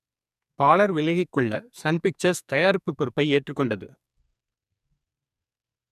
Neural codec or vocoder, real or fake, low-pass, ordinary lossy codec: codec, 44.1 kHz, 2.6 kbps, SNAC; fake; 14.4 kHz; none